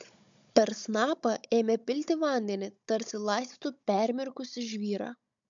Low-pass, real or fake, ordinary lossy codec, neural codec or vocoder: 7.2 kHz; fake; MP3, 64 kbps; codec, 16 kHz, 16 kbps, FunCodec, trained on Chinese and English, 50 frames a second